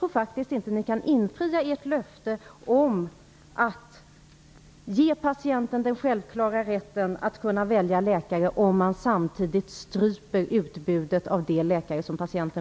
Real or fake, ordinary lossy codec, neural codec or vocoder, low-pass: real; none; none; none